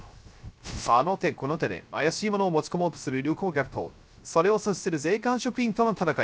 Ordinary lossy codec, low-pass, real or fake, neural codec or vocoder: none; none; fake; codec, 16 kHz, 0.3 kbps, FocalCodec